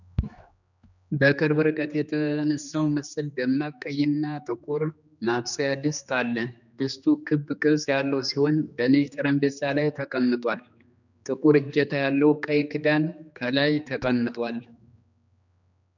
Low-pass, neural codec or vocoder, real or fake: 7.2 kHz; codec, 16 kHz, 2 kbps, X-Codec, HuBERT features, trained on general audio; fake